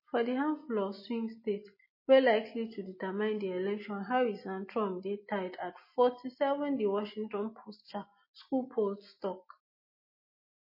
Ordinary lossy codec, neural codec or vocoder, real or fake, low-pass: MP3, 24 kbps; none; real; 5.4 kHz